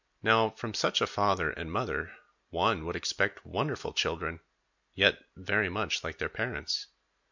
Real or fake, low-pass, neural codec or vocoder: real; 7.2 kHz; none